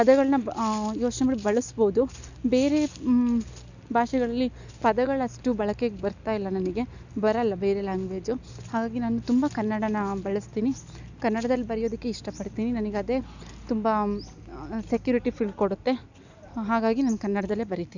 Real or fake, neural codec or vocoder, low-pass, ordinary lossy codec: real; none; 7.2 kHz; none